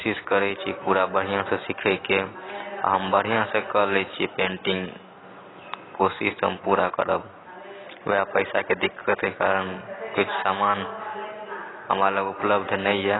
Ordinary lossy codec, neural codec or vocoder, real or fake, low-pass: AAC, 16 kbps; none; real; 7.2 kHz